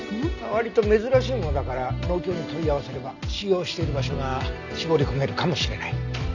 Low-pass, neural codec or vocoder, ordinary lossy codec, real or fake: 7.2 kHz; none; none; real